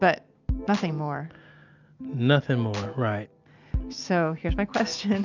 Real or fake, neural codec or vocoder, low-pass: real; none; 7.2 kHz